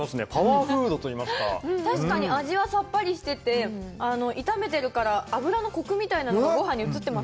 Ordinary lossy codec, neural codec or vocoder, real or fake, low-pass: none; none; real; none